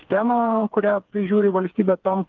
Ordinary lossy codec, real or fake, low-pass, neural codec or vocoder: Opus, 32 kbps; fake; 7.2 kHz; codec, 16 kHz, 4 kbps, FreqCodec, smaller model